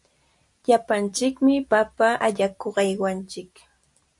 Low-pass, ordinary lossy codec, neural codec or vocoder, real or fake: 10.8 kHz; AAC, 64 kbps; none; real